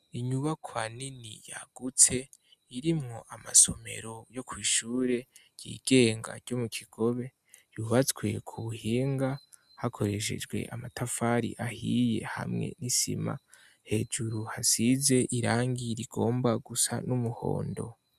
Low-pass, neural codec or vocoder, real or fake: 14.4 kHz; none; real